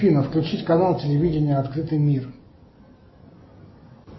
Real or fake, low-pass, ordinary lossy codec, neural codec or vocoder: real; 7.2 kHz; MP3, 24 kbps; none